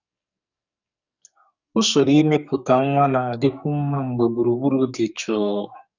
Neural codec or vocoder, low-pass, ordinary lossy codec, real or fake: codec, 44.1 kHz, 2.6 kbps, SNAC; 7.2 kHz; none; fake